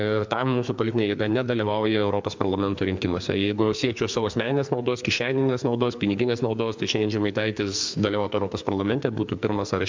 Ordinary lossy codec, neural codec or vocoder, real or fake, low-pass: MP3, 64 kbps; codec, 44.1 kHz, 2.6 kbps, SNAC; fake; 7.2 kHz